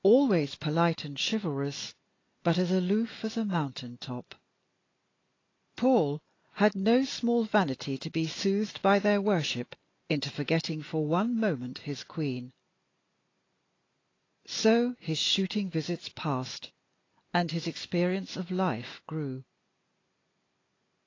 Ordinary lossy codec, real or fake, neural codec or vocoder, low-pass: AAC, 32 kbps; real; none; 7.2 kHz